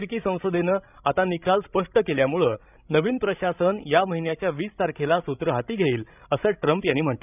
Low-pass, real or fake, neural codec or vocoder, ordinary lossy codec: 3.6 kHz; fake; codec, 16 kHz, 16 kbps, FreqCodec, larger model; none